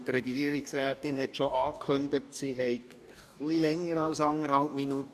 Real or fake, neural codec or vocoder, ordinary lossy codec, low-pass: fake; codec, 44.1 kHz, 2.6 kbps, DAC; none; 14.4 kHz